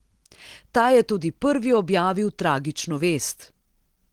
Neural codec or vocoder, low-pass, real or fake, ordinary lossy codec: none; 19.8 kHz; real; Opus, 16 kbps